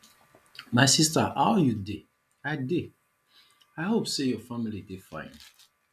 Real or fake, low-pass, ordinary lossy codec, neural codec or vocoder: real; 14.4 kHz; none; none